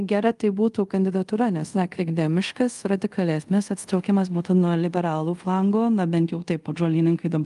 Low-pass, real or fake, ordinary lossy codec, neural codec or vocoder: 10.8 kHz; fake; Opus, 32 kbps; codec, 24 kHz, 0.5 kbps, DualCodec